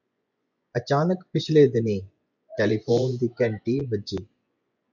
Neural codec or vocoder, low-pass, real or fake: vocoder, 24 kHz, 100 mel bands, Vocos; 7.2 kHz; fake